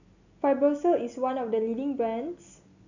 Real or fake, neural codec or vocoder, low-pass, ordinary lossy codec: real; none; 7.2 kHz; none